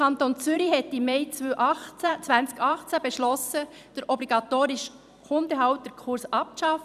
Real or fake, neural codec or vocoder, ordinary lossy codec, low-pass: fake; vocoder, 44.1 kHz, 128 mel bands every 256 samples, BigVGAN v2; none; 14.4 kHz